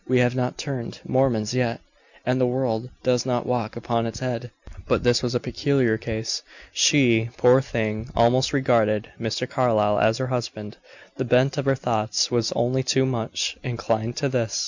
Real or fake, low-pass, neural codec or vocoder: real; 7.2 kHz; none